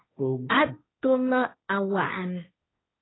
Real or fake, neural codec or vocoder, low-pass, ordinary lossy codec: fake; codec, 16 kHz, 1.1 kbps, Voila-Tokenizer; 7.2 kHz; AAC, 16 kbps